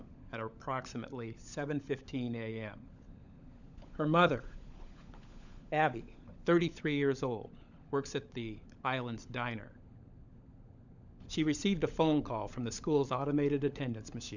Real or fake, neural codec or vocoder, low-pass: fake; codec, 16 kHz, 16 kbps, FunCodec, trained on LibriTTS, 50 frames a second; 7.2 kHz